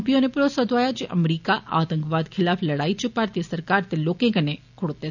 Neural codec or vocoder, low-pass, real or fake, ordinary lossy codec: none; 7.2 kHz; real; none